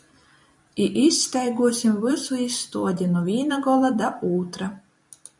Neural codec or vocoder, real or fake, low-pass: vocoder, 44.1 kHz, 128 mel bands every 256 samples, BigVGAN v2; fake; 10.8 kHz